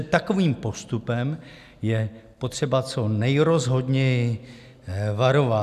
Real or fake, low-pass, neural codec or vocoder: real; 14.4 kHz; none